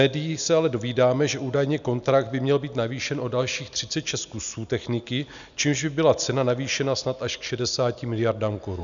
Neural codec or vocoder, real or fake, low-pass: none; real; 7.2 kHz